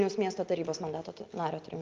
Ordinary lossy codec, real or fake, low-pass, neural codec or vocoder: Opus, 32 kbps; real; 7.2 kHz; none